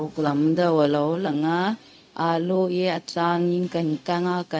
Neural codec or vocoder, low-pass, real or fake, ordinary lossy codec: codec, 16 kHz, 0.4 kbps, LongCat-Audio-Codec; none; fake; none